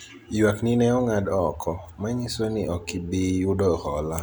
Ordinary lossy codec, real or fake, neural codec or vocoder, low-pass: none; real; none; none